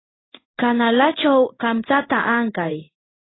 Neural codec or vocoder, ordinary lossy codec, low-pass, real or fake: codec, 16 kHz in and 24 kHz out, 1 kbps, XY-Tokenizer; AAC, 16 kbps; 7.2 kHz; fake